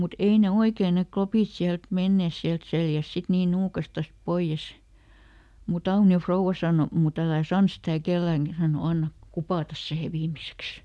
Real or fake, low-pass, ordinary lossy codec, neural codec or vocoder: real; none; none; none